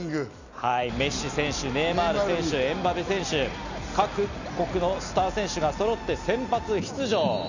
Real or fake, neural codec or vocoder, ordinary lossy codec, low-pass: real; none; none; 7.2 kHz